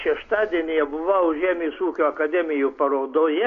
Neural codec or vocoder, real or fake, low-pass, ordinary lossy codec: none; real; 7.2 kHz; AAC, 48 kbps